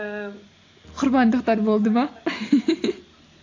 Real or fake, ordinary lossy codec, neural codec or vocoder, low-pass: real; AAC, 48 kbps; none; 7.2 kHz